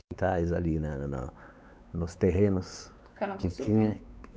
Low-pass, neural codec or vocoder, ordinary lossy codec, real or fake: none; codec, 16 kHz, 4 kbps, X-Codec, WavLM features, trained on Multilingual LibriSpeech; none; fake